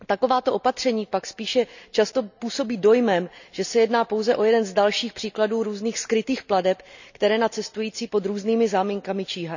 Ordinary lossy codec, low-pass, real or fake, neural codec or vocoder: none; 7.2 kHz; real; none